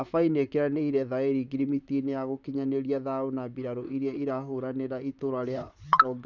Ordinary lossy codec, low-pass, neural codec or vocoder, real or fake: none; 7.2 kHz; vocoder, 44.1 kHz, 128 mel bands, Pupu-Vocoder; fake